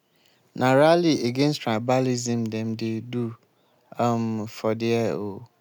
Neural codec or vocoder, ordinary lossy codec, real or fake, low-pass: none; none; real; none